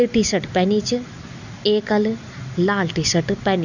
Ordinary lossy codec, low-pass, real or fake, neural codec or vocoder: none; 7.2 kHz; real; none